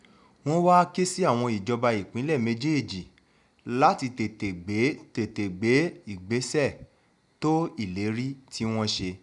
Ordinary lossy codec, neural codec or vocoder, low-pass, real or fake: none; none; 10.8 kHz; real